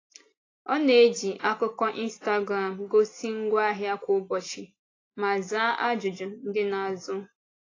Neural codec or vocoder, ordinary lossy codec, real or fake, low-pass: none; AAC, 32 kbps; real; 7.2 kHz